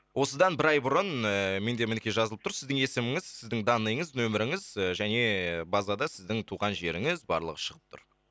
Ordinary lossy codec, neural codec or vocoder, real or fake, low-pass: none; none; real; none